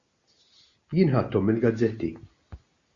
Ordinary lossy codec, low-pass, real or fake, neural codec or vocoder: Opus, 64 kbps; 7.2 kHz; real; none